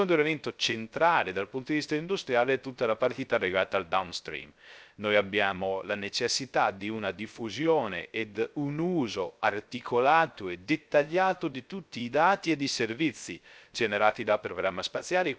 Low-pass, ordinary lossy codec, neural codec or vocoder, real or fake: none; none; codec, 16 kHz, 0.3 kbps, FocalCodec; fake